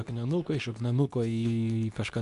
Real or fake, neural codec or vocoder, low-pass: fake; codec, 24 kHz, 0.9 kbps, WavTokenizer, medium speech release version 2; 10.8 kHz